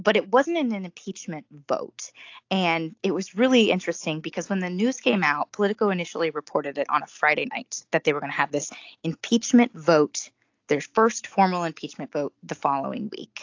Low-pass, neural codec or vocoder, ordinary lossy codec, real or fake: 7.2 kHz; none; AAC, 48 kbps; real